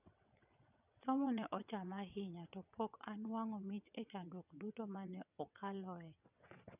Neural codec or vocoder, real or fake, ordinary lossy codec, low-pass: vocoder, 22.05 kHz, 80 mel bands, WaveNeXt; fake; none; 3.6 kHz